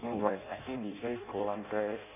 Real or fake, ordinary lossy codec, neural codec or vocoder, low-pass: fake; none; codec, 16 kHz in and 24 kHz out, 0.6 kbps, FireRedTTS-2 codec; 3.6 kHz